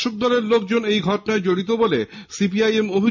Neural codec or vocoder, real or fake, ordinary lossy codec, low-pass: none; real; MP3, 48 kbps; 7.2 kHz